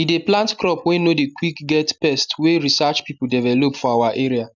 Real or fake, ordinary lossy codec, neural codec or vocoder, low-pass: real; none; none; 7.2 kHz